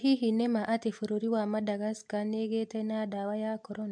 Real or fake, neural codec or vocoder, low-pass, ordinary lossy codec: real; none; 9.9 kHz; MP3, 64 kbps